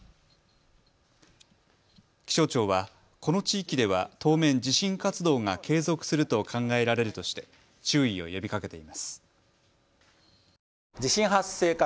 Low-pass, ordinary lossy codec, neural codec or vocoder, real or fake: none; none; none; real